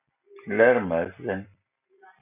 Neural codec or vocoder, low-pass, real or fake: none; 3.6 kHz; real